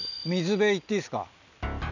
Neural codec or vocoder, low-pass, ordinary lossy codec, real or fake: none; 7.2 kHz; none; real